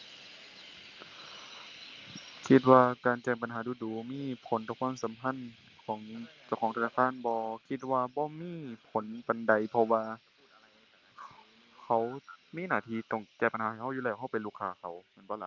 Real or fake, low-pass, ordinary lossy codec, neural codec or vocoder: real; 7.2 kHz; Opus, 24 kbps; none